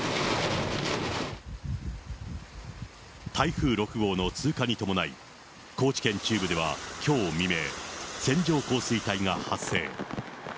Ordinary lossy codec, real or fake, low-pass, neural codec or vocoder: none; real; none; none